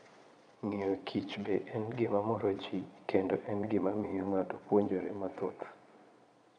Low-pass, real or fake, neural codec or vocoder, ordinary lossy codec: 9.9 kHz; fake; vocoder, 22.05 kHz, 80 mel bands, Vocos; none